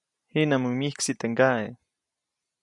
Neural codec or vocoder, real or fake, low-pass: none; real; 10.8 kHz